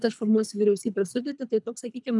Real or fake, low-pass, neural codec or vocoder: fake; 10.8 kHz; codec, 24 kHz, 3 kbps, HILCodec